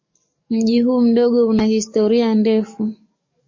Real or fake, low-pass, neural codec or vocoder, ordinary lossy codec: fake; 7.2 kHz; codec, 44.1 kHz, 7.8 kbps, DAC; MP3, 32 kbps